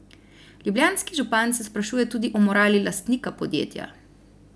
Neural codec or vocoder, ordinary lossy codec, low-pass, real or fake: none; none; none; real